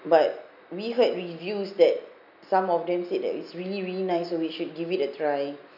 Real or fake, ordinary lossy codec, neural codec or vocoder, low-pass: real; none; none; 5.4 kHz